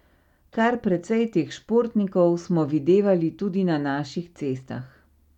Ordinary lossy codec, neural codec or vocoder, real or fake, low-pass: none; none; real; 19.8 kHz